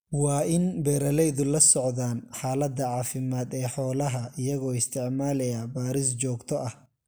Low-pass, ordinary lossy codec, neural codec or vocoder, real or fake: none; none; none; real